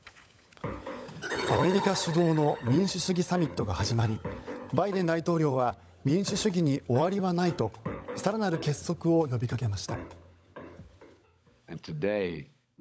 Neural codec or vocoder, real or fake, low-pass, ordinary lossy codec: codec, 16 kHz, 16 kbps, FunCodec, trained on LibriTTS, 50 frames a second; fake; none; none